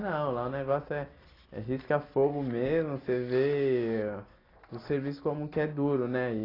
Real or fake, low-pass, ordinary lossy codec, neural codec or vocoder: real; 5.4 kHz; AAC, 24 kbps; none